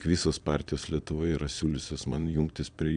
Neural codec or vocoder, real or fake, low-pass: vocoder, 22.05 kHz, 80 mel bands, Vocos; fake; 9.9 kHz